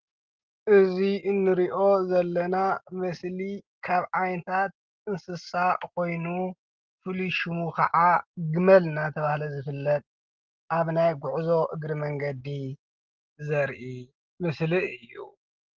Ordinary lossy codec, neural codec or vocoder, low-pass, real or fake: Opus, 16 kbps; none; 7.2 kHz; real